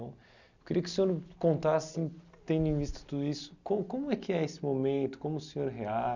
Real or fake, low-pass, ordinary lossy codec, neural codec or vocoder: real; 7.2 kHz; none; none